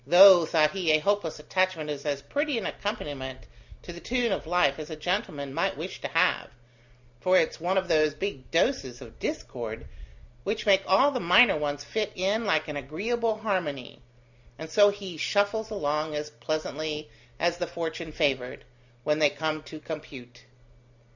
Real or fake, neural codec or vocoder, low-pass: real; none; 7.2 kHz